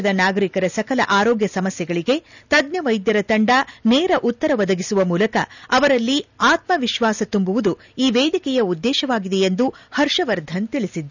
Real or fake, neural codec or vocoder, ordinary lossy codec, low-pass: real; none; none; 7.2 kHz